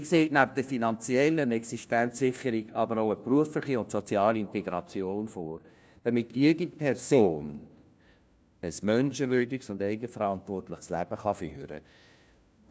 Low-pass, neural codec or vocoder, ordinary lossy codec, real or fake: none; codec, 16 kHz, 1 kbps, FunCodec, trained on LibriTTS, 50 frames a second; none; fake